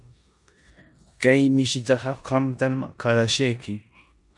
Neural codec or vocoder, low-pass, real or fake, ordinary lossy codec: codec, 16 kHz in and 24 kHz out, 0.9 kbps, LongCat-Audio-Codec, four codebook decoder; 10.8 kHz; fake; MP3, 96 kbps